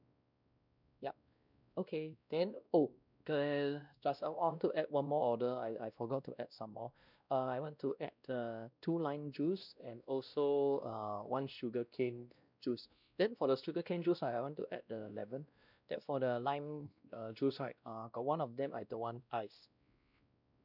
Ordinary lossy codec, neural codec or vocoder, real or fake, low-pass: none; codec, 16 kHz, 1 kbps, X-Codec, WavLM features, trained on Multilingual LibriSpeech; fake; 5.4 kHz